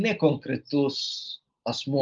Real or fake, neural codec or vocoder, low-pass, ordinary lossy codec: real; none; 7.2 kHz; Opus, 32 kbps